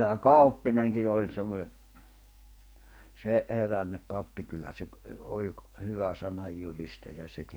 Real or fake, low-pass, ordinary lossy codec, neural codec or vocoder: fake; none; none; codec, 44.1 kHz, 2.6 kbps, SNAC